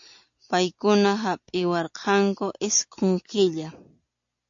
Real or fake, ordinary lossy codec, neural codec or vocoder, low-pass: real; AAC, 64 kbps; none; 7.2 kHz